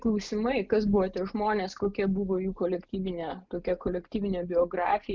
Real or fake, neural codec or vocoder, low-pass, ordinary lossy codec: real; none; 7.2 kHz; Opus, 24 kbps